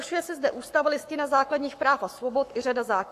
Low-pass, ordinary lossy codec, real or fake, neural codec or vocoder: 14.4 kHz; AAC, 64 kbps; fake; codec, 44.1 kHz, 7.8 kbps, Pupu-Codec